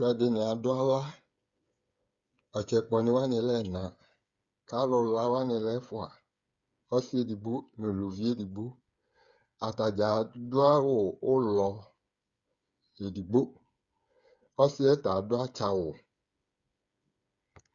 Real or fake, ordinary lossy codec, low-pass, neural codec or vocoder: fake; Opus, 64 kbps; 7.2 kHz; codec, 16 kHz, 8 kbps, FreqCodec, smaller model